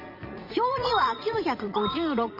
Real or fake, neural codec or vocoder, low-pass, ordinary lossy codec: fake; vocoder, 44.1 kHz, 80 mel bands, Vocos; 5.4 kHz; Opus, 32 kbps